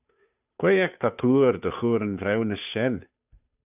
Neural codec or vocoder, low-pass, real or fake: codec, 16 kHz, 2 kbps, FunCodec, trained on Chinese and English, 25 frames a second; 3.6 kHz; fake